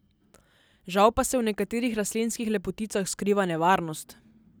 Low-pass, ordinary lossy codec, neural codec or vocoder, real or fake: none; none; none; real